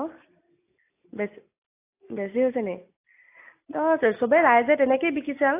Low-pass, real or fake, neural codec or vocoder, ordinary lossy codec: 3.6 kHz; real; none; AAC, 24 kbps